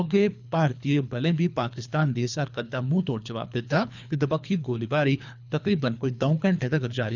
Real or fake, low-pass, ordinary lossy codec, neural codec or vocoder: fake; 7.2 kHz; none; codec, 24 kHz, 3 kbps, HILCodec